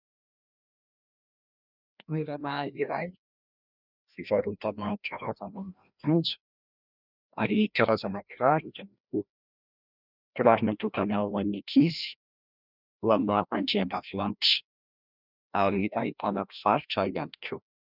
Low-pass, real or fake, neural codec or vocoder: 5.4 kHz; fake; codec, 16 kHz, 1 kbps, FreqCodec, larger model